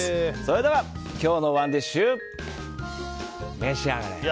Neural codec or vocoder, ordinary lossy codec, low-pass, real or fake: none; none; none; real